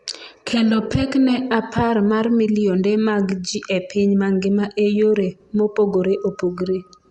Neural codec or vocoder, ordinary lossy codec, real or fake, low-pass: none; Opus, 64 kbps; real; 10.8 kHz